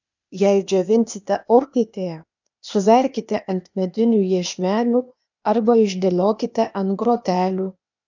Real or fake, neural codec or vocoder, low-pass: fake; codec, 16 kHz, 0.8 kbps, ZipCodec; 7.2 kHz